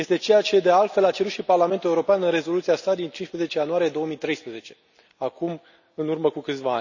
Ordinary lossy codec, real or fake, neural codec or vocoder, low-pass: none; real; none; 7.2 kHz